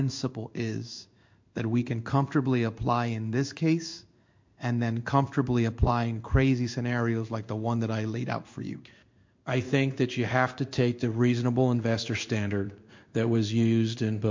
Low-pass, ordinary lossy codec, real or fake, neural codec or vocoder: 7.2 kHz; MP3, 48 kbps; fake; codec, 16 kHz in and 24 kHz out, 1 kbps, XY-Tokenizer